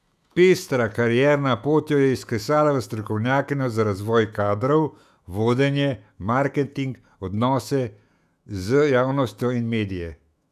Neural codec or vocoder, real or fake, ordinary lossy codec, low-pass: autoencoder, 48 kHz, 128 numbers a frame, DAC-VAE, trained on Japanese speech; fake; none; 14.4 kHz